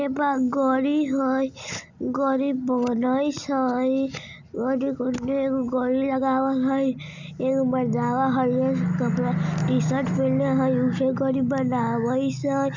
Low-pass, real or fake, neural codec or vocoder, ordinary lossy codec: 7.2 kHz; real; none; none